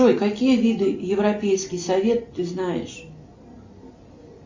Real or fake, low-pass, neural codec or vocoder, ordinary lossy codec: real; 7.2 kHz; none; MP3, 64 kbps